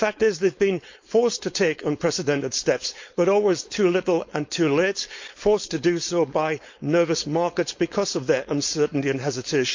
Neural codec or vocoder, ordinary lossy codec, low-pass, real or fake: codec, 16 kHz, 4.8 kbps, FACodec; MP3, 48 kbps; 7.2 kHz; fake